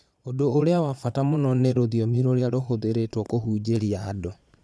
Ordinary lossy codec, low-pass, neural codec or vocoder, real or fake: none; none; vocoder, 22.05 kHz, 80 mel bands, Vocos; fake